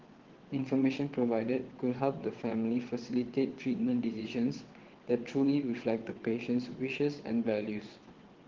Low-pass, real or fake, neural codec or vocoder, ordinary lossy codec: 7.2 kHz; fake; vocoder, 22.05 kHz, 80 mel bands, WaveNeXt; Opus, 16 kbps